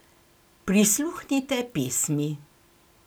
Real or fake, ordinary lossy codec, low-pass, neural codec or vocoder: real; none; none; none